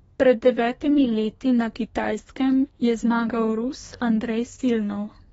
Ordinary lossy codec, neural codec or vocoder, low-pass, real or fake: AAC, 24 kbps; codec, 32 kHz, 1.9 kbps, SNAC; 14.4 kHz; fake